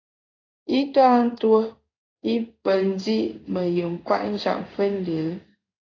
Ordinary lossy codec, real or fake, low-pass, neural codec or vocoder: AAC, 32 kbps; fake; 7.2 kHz; codec, 16 kHz in and 24 kHz out, 1 kbps, XY-Tokenizer